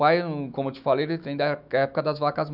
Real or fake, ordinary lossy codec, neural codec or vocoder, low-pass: fake; none; autoencoder, 48 kHz, 128 numbers a frame, DAC-VAE, trained on Japanese speech; 5.4 kHz